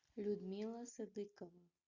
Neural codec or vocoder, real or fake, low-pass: none; real; 7.2 kHz